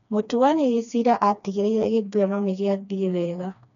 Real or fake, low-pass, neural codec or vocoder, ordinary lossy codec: fake; 7.2 kHz; codec, 16 kHz, 2 kbps, FreqCodec, smaller model; none